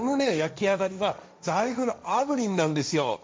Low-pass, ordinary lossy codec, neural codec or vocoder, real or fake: none; none; codec, 16 kHz, 1.1 kbps, Voila-Tokenizer; fake